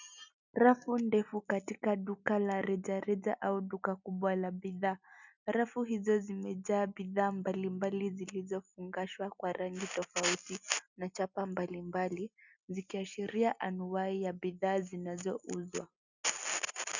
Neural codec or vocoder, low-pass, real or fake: none; 7.2 kHz; real